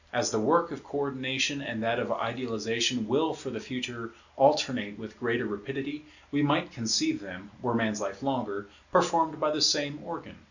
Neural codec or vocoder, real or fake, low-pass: none; real; 7.2 kHz